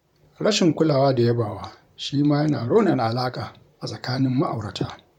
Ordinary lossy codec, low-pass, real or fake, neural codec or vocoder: none; 19.8 kHz; fake; vocoder, 44.1 kHz, 128 mel bands, Pupu-Vocoder